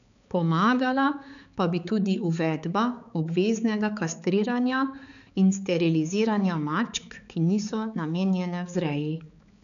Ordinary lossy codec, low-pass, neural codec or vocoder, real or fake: none; 7.2 kHz; codec, 16 kHz, 4 kbps, X-Codec, HuBERT features, trained on balanced general audio; fake